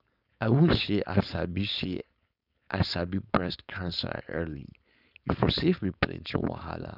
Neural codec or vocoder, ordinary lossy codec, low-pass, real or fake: codec, 16 kHz, 4.8 kbps, FACodec; none; 5.4 kHz; fake